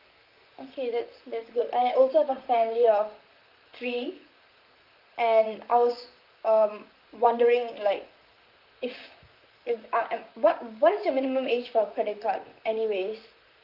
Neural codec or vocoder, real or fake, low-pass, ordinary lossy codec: vocoder, 44.1 kHz, 128 mel bands, Pupu-Vocoder; fake; 5.4 kHz; Opus, 24 kbps